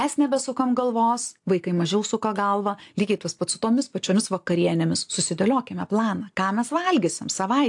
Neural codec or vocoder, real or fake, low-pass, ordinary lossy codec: none; real; 10.8 kHz; AAC, 64 kbps